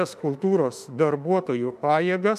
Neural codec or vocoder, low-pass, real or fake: autoencoder, 48 kHz, 32 numbers a frame, DAC-VAE, trained on Japanese speech; 14.4 kHz; fake